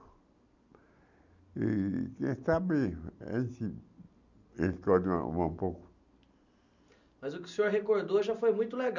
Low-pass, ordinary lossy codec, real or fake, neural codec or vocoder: 7.2 kHz; none; real; none